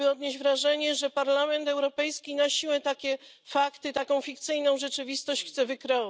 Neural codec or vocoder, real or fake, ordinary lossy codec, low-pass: none; real; none; none